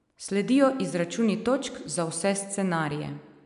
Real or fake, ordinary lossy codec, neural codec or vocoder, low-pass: real; none; none; 10.8 kHz